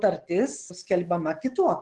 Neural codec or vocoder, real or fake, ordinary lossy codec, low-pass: none; real; Opus, 16 kbps; 10.8 kHz